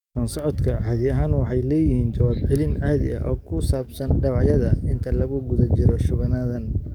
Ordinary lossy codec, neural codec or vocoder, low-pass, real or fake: none; codec, 44.1 kHz, 7.8 kbps, DAC; 19.8 kHz; fake